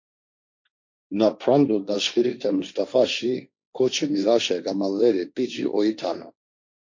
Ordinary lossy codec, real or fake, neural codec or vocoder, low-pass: MP3, 48 kbps; fake; codec, 16 kHz, 1.1 kbps, Voila-Tokenizer; 7.2 kHz